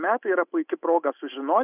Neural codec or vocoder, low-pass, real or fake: none; 3.6 kHz; real